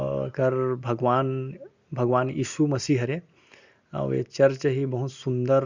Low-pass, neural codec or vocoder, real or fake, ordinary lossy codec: 7.2 kHz; none; real; Opus, 64 kbps